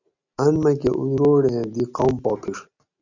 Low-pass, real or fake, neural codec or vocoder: 7.2 kHz; real; none